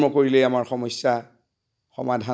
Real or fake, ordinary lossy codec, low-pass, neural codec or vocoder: real; none; none; none